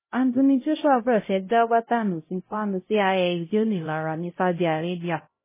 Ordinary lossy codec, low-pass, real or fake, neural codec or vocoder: MP3, 16 kbps; 3.6 kHz; fake; codec, 16 kHz, 0.5 kbps, X-Codec, HuBERT features, trained on LibriSpeech